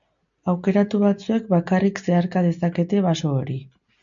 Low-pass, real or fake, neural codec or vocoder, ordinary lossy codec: 7.2 kHz; real; none; MP3, 64 kbps